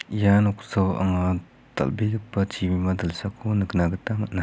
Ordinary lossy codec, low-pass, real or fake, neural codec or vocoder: none; none; real; none